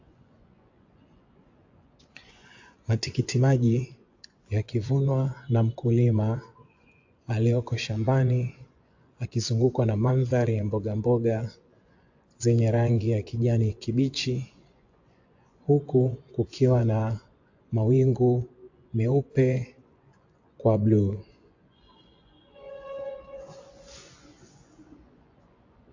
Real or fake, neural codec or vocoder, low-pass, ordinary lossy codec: fake; vocoder, 24 kHz, 100 mel bands, Vocos; 7.2 kHz; AAC, 48 kbps